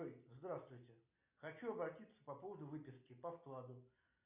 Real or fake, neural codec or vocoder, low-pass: real; none; 3.6 kHz